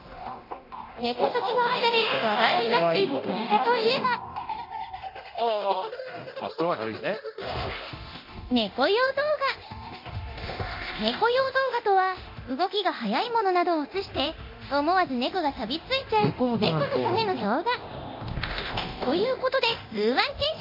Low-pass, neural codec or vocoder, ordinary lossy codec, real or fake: 5.4 kHz; codec, 24 kHz, 0.9 kbps, DualCodec; MP3, 32 kbps; fake